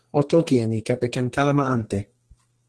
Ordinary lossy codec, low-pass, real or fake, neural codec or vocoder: Opus, 16 kbps; 10.8 kHz; fake; codec, 32 kHz, 1.9 kbps, SNAC